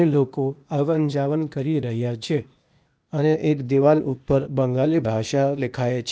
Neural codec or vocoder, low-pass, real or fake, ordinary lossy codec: codec, 16 kHz, 0.8 kbps, ZipCodec; none; fake; none